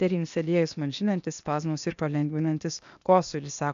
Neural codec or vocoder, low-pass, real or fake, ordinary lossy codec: codec, 16 kHz, 0.8 kbps, ZipCodec; 7.2 kHz; fake; AAC, 96 kbps